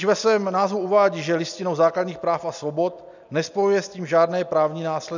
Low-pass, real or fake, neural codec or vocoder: 7.2 kHz; real; none